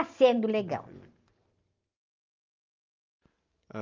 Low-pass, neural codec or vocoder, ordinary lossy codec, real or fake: 7.2 kHz; none; Opus, 32 kbps; real